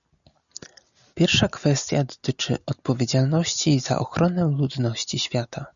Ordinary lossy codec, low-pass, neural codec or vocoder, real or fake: MP3, 96 kbps; 7.2 kHz; none; real